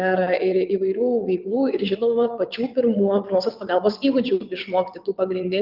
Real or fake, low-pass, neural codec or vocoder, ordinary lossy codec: fake; 5.4 kHz; vocoder, 22.05 kHz, 80 mel bands, WaveNeXt; Opus, 32 kbps